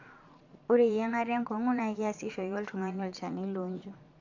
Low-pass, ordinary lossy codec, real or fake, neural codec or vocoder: 7.2 kHz; MP3, 64 kbps; fake; vocoder, 22.05 kHz, 80 mel bands, Vocos